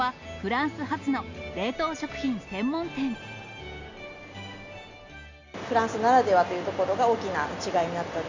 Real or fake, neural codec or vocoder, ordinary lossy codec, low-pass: real; none; MP3, 64 kbps; 7.2 kHz